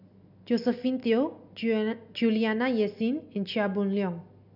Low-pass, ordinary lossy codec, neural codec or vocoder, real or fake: 5.4 kHz; none; none; real